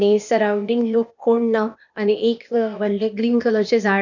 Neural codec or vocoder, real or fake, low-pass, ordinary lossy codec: codec, 16 kHz, 0.8 kbps, ZipCodec; fake; 7.2 kHz; none